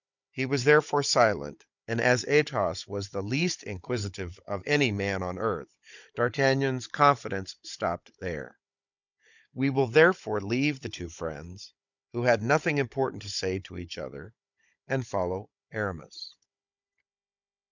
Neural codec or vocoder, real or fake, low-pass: codec, 16 kHz, 16 kbps, FunCodec, trained on Chinese and English, 50 frames a second; fake; 7.2 kHz